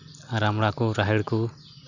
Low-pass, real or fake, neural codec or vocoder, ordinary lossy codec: 7.2 kHz; real; none; none